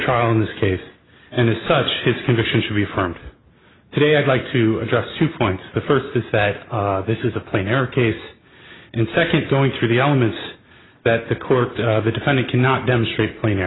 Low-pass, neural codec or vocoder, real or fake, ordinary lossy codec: 7.2 kHz; none; real; AAC, 16 kbps